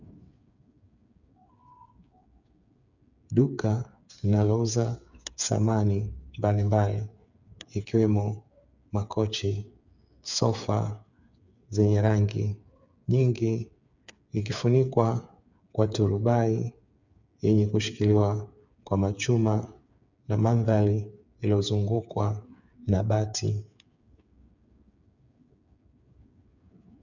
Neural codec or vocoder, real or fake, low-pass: codec, 16 kHz, 8 kbps, FreqCodec, smaller model; fake; 7.2 kHz